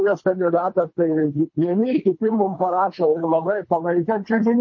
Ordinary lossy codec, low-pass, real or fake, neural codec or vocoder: MP3, 32 kbps; 7.2 kHz; fake; codec, 24 kHz, 3 kbps, HILCodec